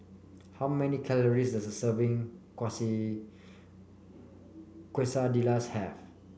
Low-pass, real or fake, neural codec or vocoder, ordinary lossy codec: none; real; none; none